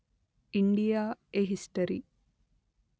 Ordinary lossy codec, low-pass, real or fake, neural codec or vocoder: none; none; real; none